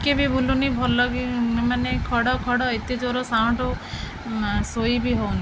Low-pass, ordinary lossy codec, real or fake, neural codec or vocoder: none; none; real; none